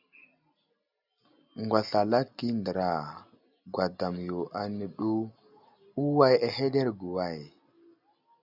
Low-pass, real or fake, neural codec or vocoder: 5.4 kHz; real; none